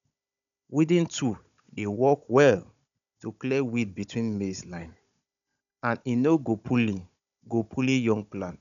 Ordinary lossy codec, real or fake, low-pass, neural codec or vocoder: none; fake; 7.2 kHz; codec, 16 kHz, 16 kbps, FunCodec, trained on Chinese and English, 50 frames a second